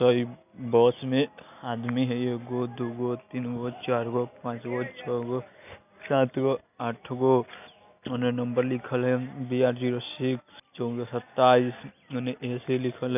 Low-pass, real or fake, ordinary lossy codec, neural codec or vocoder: 3.6 kHz; real; none; none